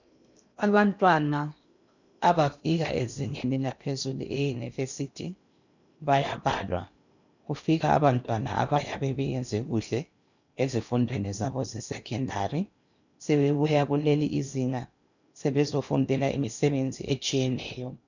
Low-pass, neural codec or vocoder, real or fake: 7.2 kHz; codec, 16 kHz in and 24 kHz out, 0.8 kbps, FocalCodec, streaming, 65536 codes; fake